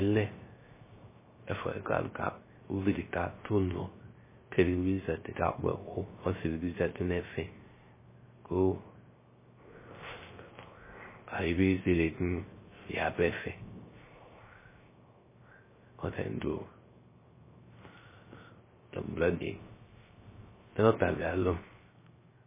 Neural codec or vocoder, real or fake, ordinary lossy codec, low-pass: codec, 16 kHz, 0.3 kbps, FocalCodec; fake; MP3, 16 kbps; 3.6 kHz